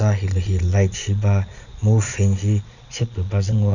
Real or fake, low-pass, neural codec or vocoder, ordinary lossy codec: fake; 7.2 kHz; vocoder, 22.05 kHz, 80 mel bands, Vocos; none